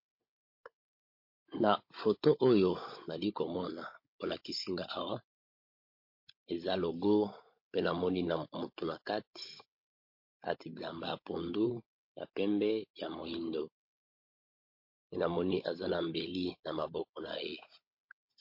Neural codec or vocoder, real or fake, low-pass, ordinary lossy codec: codec, 16 kHz, 16 kbps, FunCodec, trained on Chinese and English, 50 frames a second; fake; 5.4 kHz; MP3, 32 kbps